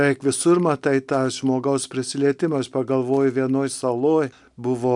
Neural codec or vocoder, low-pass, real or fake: none; 10.8 kHz; real